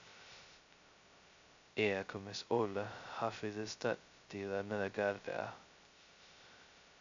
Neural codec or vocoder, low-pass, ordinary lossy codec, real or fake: codec, 16 kHz, 0.2 kbps, FocalCodec; 7.2 kHz; MP3, 64 kbps; fake